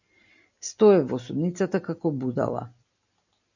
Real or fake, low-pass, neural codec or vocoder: real; 7.2 kHz; none